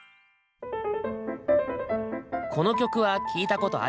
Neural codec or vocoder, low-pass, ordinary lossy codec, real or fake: none; none; none; real